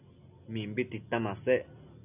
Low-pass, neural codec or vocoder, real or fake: 3.6 kHz; none; real